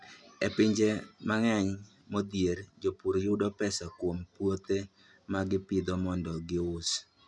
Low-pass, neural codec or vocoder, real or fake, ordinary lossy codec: 10.8 kHz; none; real; none